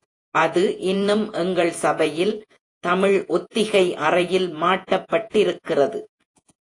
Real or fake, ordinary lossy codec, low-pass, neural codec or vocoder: fake; AAC, 48 kbps; 10.8 kHz; vocoder, 48 kHz, 128 mel bands, Vocos